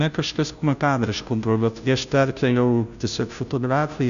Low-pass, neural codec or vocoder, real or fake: 7.2 kHz; codec, 16 kHz, 0.5 kbps, FunCodec, trained on Chinese and English, 25 frames a second; fake